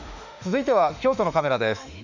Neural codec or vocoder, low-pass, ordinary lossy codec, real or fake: autoencoder, 48 kHz, 32 numbers a frame, DAC-VAE, trained on Japanese speech; 7.2 kHz; none; fake